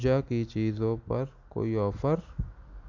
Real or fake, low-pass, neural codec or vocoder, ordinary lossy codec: real; 7.2 kHz; none; none